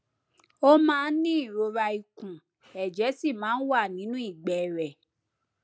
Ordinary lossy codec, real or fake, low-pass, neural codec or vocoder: none; real; none; none